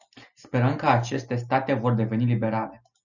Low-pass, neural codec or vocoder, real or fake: 7.2 kHz; none; real